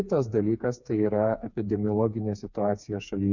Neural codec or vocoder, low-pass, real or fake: codec, 16 kHz, 4 kbps, FreqCodec, smaller model; 7.2 kHz; fake